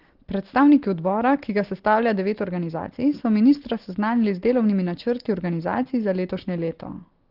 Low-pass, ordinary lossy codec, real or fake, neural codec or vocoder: 5.4 kHz; Opus, 16 kbps; real; none